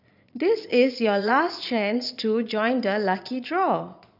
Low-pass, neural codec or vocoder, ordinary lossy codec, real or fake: 5.4 kHz; vocoder, 22.05 kHz, 80 mel bands, Vocos; none; fake